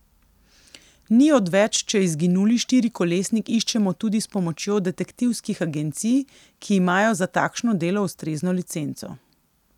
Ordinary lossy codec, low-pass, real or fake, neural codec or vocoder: none; 19.8 kHz; real; none